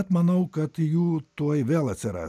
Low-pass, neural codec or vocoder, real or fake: 14.4 kHz; none; real